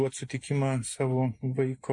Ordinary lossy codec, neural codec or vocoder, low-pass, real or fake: MP3, 48 kbps; none; 10.8 kHz; real